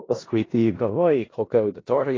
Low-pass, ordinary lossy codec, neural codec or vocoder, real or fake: 7.2 kHz; AAC, 32 kbps; codec, 16 kHz in and 24 kHz out, 0.4 kbps, LongCat-Audio-Codec, four codebook decoder; fake